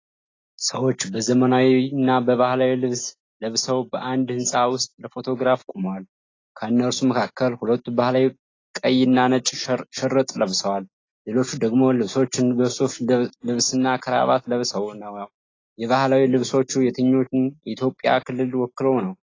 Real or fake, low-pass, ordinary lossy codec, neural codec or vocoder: real; 7.2 kHz; AAC, 32 kbps; none